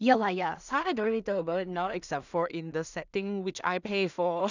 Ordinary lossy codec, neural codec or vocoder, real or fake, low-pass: none; codec, 16 kHz in and 24 kHz out, 0.4 kbps, LongCat-Audio-Codec, two codebook decoder; fake; 7.2 kHz